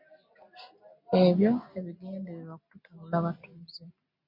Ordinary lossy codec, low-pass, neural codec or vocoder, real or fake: MP3, 32 kbps; 5.4 kHz; none; real